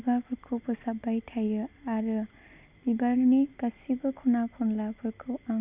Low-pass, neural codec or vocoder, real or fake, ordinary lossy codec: 3.6 kHz; none; real; Opus, 64 kbps